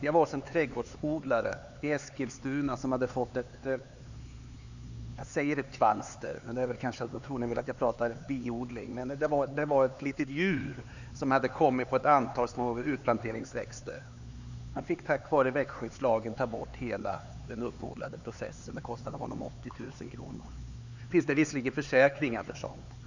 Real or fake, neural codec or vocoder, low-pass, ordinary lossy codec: fake; codec, 16 kHz, 4 kbps, X-Codec, HuBERT features, trained on LibriSpeech; 7.2 kHz; Opus, 64 kbps